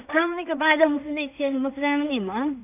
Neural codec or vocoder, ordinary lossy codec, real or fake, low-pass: codec, 16 kHz in and 24 kHz out, 0.4 kbps, LongCat-Audio-Codec, two codebook decoder; none; fake; 3.6 kHz